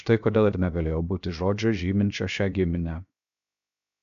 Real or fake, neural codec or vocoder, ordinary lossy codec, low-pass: fake; codec, 16 kHz, 0.7 kbps, FocalCodec; MP3, 96 kbps; 7.2 kHz